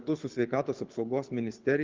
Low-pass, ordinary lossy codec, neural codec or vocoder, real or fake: 7.2 kHz; Opus, 32 kbps; codec, 24 kHz, 6 kbps, HILCodec; fake